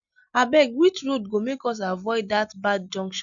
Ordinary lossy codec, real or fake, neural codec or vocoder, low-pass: none; real; none; 7.2 kHz